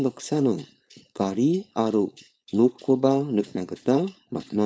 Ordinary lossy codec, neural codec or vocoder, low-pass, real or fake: none; codec, 16 kHz, 4.8 kbps, FACodec; none; fake